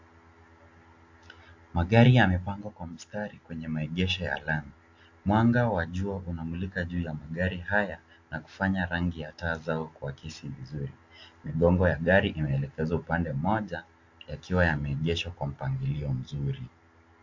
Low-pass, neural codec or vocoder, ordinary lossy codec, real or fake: 7.2 kHz; none; AAC, 48 kbps; real